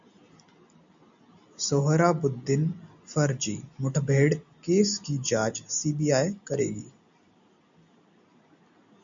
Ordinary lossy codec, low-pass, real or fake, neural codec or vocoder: MP3, 64 kbps; 7.2 kHz; real; none